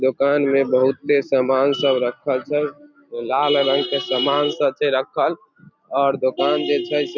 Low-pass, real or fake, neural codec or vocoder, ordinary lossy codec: 7.2 kHz; real; none; none